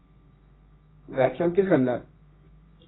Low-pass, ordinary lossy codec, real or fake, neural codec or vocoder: 7.2 kHz; AAC, 16 kbps; fake; codec, 24 kHz, 0.9 kbps, WavTokenizer, medium music audio release